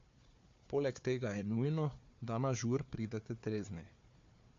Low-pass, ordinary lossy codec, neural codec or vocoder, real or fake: 7.2 kHz; MP3, 48 kbps; codec, 16 kHz, 4 kbps, FunCodec, trained on Chinese and English, 50 frames a second; fake